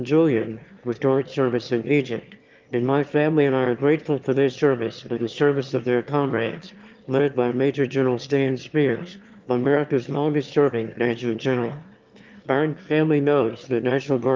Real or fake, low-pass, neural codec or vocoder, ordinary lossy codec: fake; 7.2 kHz; autoencoder, 22.05 kHz, a latent of 192 numbers a frame, VITS, trained on one speaker; Opus, 24 kbps